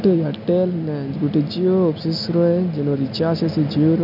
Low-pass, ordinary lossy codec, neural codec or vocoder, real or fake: 5.4 kHz; none; none; real